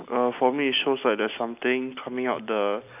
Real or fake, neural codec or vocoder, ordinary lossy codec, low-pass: real; none; none; 3.6 kHz